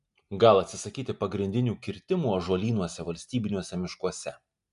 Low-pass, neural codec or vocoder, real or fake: 10.8 kHz; none; real